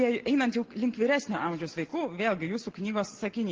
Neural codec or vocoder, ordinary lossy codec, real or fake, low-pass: none; Opus, 16 kbps; real; 7.2 kHz